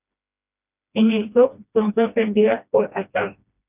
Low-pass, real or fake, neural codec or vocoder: 3.6 kHz; fake; codec, 16 kHz, 1 kbps, FreqCodec, smaller model